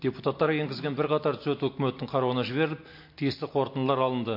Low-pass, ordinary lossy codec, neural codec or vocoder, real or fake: 5.4 kHz; MP3, 32 kbps; none; real